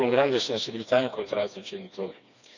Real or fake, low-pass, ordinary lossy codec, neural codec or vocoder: fake; 7.2 kHz; none; codec, 16 kHz, 2 kbps, FreqCodec, smaller model